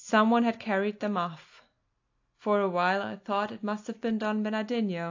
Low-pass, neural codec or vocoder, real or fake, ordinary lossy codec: 7.2 kHz; none; real; AAC, 48 kbps